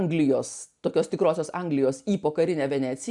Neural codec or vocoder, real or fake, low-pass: none; real; 10.8 kHz